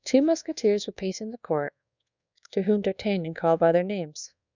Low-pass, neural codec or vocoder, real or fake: 7.2 kHz; codec, 24 kHz, 1.2 kbps, DualCodec; fake